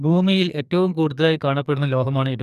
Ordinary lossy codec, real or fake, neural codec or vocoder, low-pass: none; fake; codec, 44.1 kHz, 2.6 kbps, SNAC; 14.4 kHz